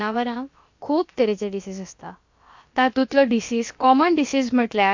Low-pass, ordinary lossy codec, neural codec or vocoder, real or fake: 7.2 kHz; MP3, 48 kbps; codec, 16 kHz, about 1 kbps, DyCAST, with the encoder's durations; fake